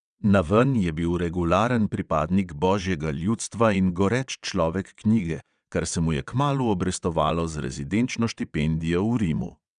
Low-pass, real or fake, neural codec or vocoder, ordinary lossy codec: 9.9 kHz; fake; vocoder, 22.05 kHz, 80 mel bands, WaveNeXt; none